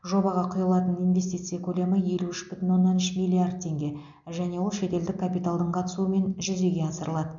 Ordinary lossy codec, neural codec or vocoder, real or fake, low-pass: none; none; real; 7.2 kHz